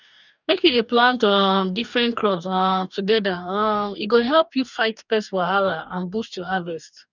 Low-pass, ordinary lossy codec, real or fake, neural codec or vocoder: 7.2 kHz; none; fake; codec, 44.1 kHz, 2.6 kbps, DAC